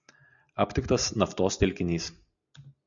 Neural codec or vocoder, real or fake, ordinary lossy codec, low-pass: none; real; MP3, 96 kbps; 7.2 kHz